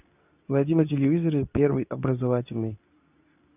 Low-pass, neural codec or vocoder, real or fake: 3.6 kHz; codec, 24 kHz, 0.9 kbps, WavTokenizer, medium speech release version 2; fake